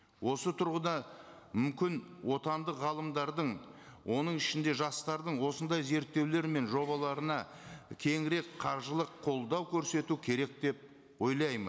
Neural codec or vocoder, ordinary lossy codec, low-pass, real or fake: none; none; none; real